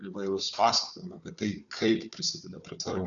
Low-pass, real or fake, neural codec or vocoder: 7.2 kHz; fake; codec, 16 kHz, 4 kbps, FunCodec, trained on Chinese and English, 50 frames a second